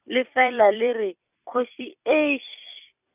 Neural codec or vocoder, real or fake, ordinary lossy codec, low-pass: vocoder, 44.1 kHz, 128 mel bands every 256 samples, BigVGAN v2; fake; none; 3.6 kHz